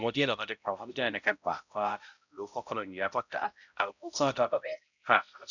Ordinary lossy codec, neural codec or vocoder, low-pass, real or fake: none; codec, 16 kHz, 0.5 kbps, X-Codec, HuBERT features, trained on balanced general audio; 7.2 kHz; fake